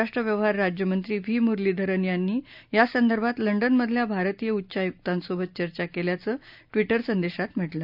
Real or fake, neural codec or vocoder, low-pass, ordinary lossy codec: real; none; 5.4 kHz; none